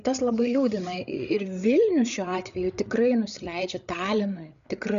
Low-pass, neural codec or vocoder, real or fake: 7.2 kHz; codec, 16 kHz, 8 kbps, FreqCodec, larger model; fake